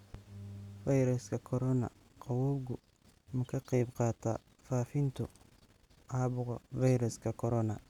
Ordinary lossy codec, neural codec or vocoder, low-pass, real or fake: none; none; 19.8 kHz; real